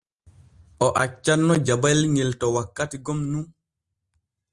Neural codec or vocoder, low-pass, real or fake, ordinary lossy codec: none; 10.8 kHz; real; Opus, 24 kbps